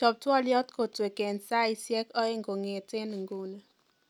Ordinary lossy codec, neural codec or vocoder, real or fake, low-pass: none; none; real; 19.8 kHz